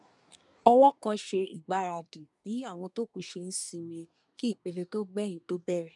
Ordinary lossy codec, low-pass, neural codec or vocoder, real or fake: none; 10.8 kHz; codec, 24 kHz, 1 kbps, SNAC; fake